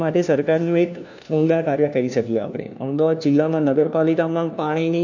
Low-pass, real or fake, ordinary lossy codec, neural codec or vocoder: 7.2 kHz; fake; none; codec, 16 kHz, 1 kbps, FunCodec, trained on LibriTTS, 50 frames a second